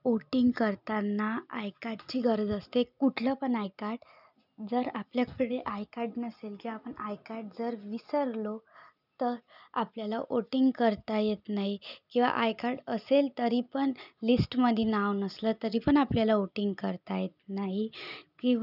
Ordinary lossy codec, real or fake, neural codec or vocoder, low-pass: none; real; none; 5.4 kHz